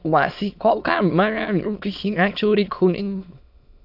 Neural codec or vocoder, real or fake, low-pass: autoencoder, 22.05 kHz, a latent of 192 numbers a frame, VITS, trained on many speakers; fake; 5.4 kHz